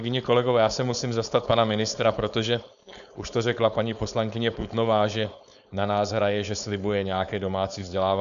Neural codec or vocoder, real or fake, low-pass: codec, 16 kHz, 4.8 kbps, FACodec; fake; 7.2 kHz